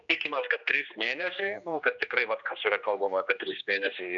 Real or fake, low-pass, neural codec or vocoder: fake; 7.2 kHz; codec, 16 kHz, 2 kbps, X-Codec, HuBERT features, trained on general audio